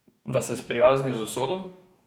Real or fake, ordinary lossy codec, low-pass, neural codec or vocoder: fake; none; none; codec, 44.1 kHz, 2.6 kbps, DAC